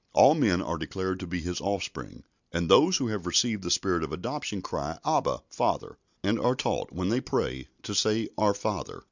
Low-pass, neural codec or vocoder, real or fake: 7.2 kHz; none; real